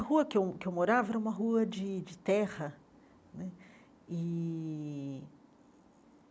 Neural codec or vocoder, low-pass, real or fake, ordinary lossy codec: none; none; real; none